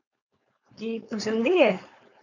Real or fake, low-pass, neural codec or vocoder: fake; 7.2 kHz; codec, 16 kHz, 4.8 kbps, FACodec